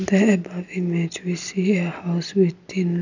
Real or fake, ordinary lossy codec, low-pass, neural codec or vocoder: real; none; 7.2 kHz; none